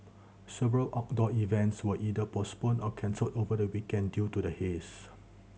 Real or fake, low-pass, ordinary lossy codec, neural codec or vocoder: real; none; none; none